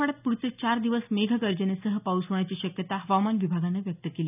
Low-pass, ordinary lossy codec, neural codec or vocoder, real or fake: 3.6 kHz; none; none; real